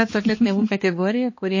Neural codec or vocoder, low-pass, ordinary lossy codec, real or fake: codec, 16 kHz, 2 kbps, X-Codec, HuBERT features, trained on balanced general audio; 7.2 kHz; MP3, 32 kbps; fake